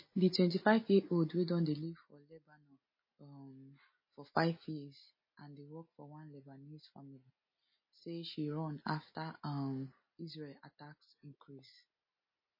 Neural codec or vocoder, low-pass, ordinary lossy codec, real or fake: none; 5.4 kHz; MP3, 24 kbps; real